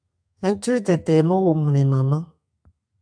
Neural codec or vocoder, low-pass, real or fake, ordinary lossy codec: codec, 32 kHz, 1.9 kbps, SNAC; 9.9 kHz; fake; AAC, 64 kbps